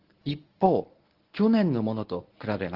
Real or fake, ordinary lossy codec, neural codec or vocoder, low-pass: fake; Opus, 16 kbps; codec, 24 kHz, 0.9 kbps, WavTokenizer, medium speech release version 1; 5.4 kHz